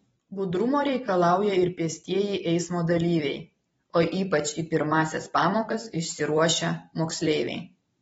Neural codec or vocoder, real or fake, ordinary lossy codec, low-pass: vocoder, 44.1 kHz, 128 mel bands every 256 samples, BigVGAN v2; fake; AAC, 24 kbps; 19.8 kHz